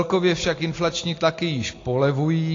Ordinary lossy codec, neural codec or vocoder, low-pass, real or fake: AAC, 32 kbps; none; 7.2 kHz; real